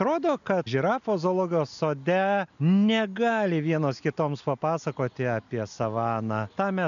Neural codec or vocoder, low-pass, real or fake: none; 7.2 kHz; real